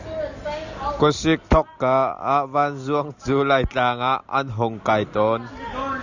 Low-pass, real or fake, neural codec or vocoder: 7.2 kHz; real; none